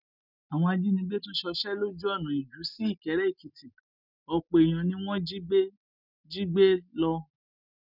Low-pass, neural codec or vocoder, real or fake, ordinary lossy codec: 5.4 kHz; none; real; none